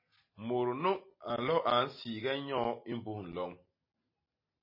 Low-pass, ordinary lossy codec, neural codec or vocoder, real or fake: 5.4 kHz; MP3, 24 kbps; none; real